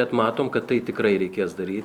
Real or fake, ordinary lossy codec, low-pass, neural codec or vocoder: fake; Opus, 64 kbps; 19.8 kHz; vocoder, 44.1 kHz, 128 mel bands every 256 samples, BigVGAN v2